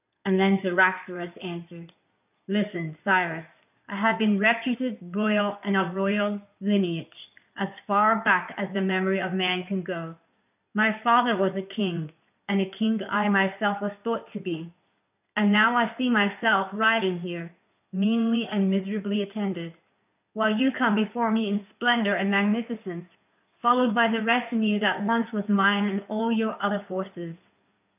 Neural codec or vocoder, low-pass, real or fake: codec, 16 kHz in and 24 kHz out, 2.2 kbps, FireRedTTS-2 codec; 3.6 kHz; fake